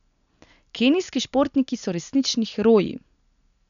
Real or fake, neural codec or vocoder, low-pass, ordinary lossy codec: real; none; 7.2 kHz; MP3, 96 kbps